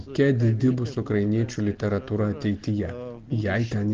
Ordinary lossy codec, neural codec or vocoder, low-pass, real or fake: Opus, 16 kbps; none; 7.2 kHz; real